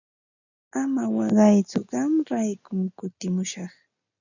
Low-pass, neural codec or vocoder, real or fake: 7.2 kHz; none; real